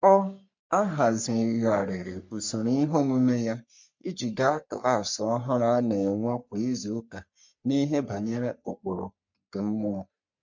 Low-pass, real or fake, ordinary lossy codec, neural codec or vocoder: 7.2 kHz; fake; MP3, 48 kbps; codec, 44.1 kHz, 3.4 kbps, Pupu-Codec